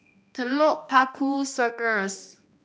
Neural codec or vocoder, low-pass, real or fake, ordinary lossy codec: codec, 16 kHz, 1 kbps, X-Codec, HuBERT features, trained on balanced general audio; none; fake; none